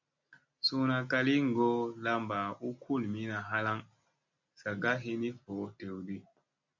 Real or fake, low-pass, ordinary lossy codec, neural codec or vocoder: real; 7.2 kHz; MP3, 64 kbps; none